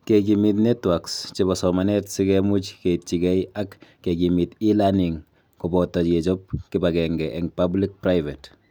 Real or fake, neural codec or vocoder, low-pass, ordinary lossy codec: real; none; none; none